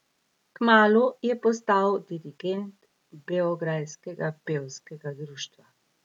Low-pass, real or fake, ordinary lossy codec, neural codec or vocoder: 19.8 kHz; real; none; none